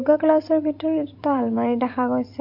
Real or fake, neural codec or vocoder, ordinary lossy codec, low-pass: fake; vocoder, 44.1 kHz, 128 mel bands every 256 samples, BigVGAN v2; AAC, 48 kbps; 5.4 kHz